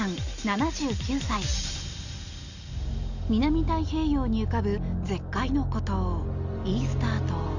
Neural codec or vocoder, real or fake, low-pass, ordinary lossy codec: none; real; 7.2 kHz; none